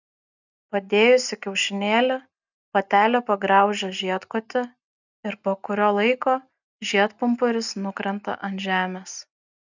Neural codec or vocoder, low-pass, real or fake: none; 7.2 kHz; real